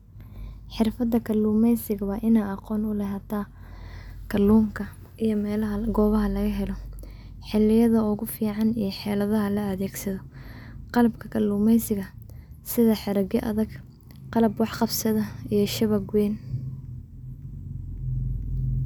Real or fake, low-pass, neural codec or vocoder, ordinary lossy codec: real; 19.8 kHz; none; none